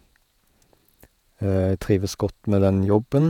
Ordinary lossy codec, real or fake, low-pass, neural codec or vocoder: none; fake; 19.8 kHz; vocoder, 48 kHz, 128 mel bands, Vocos